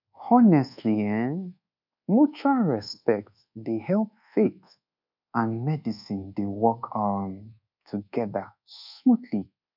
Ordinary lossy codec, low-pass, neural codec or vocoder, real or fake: none; 5.4 kHz; codec, 24 kHz, 1.2 kbps, DualCodec; fake